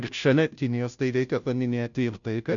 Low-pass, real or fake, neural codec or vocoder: 7.2 kHz; fake; codec, 16 kHz, 0.5 kbps, FunCodec, trained on Chinese and English, 25 frames a second